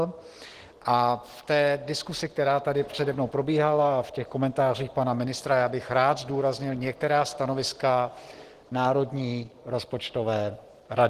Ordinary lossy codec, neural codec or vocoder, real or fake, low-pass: Opus, 16 kbps; none; real; 14.4 kHz